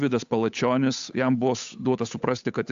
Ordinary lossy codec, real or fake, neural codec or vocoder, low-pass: AAC, 64 kbps; fake; codec, 16 kHz, 8 kbps, FunCodec, trained on Chinese and English, 25 frames a second; 7.2 kHz